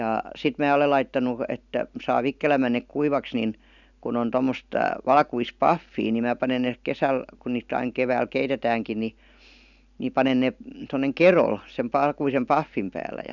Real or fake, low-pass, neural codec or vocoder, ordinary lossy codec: real; 7.2 kHz; none; none